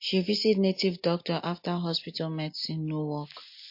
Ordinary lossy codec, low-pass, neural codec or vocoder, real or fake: MP3, 32 kbps; 5.4 kHz; none; real